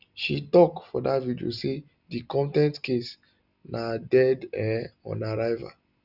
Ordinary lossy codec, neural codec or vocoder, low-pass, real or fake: none; none; 5.4 kHz; real